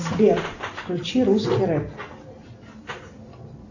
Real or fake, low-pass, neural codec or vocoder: real; 7.2 kHz; none